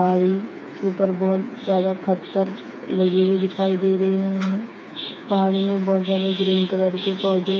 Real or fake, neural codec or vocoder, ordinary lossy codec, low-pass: fake; codec, 16 kHz, 4 kbps, FreqCodec, smaller model; none; none